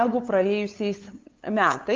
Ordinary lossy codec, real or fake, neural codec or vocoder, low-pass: Opus, 24 kbps; fake; codec, 16 kHz, 8 kbps, FunCodec, trained on LibriTTS, 25 frames a second; 7.2 kHz